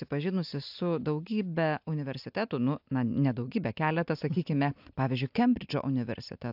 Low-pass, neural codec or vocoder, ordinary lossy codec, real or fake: 5.4 kHz; none; AAC, 48 kbps; real